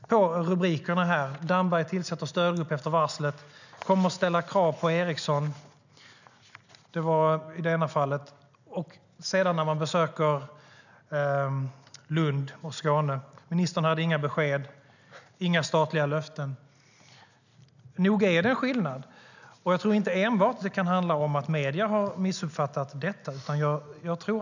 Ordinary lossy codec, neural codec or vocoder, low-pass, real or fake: none; none; 7.2 kHz; real